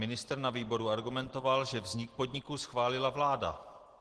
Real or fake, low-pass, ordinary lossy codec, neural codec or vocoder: real; 10.8 kHz; Opus, 16 kbps; none